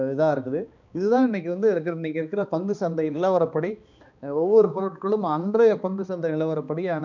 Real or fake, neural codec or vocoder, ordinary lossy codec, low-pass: fake; codec, 16 kHz, 2 kbps, X-Codec, HuBERT features, trained on balanced general audio; none; 7.2 kHz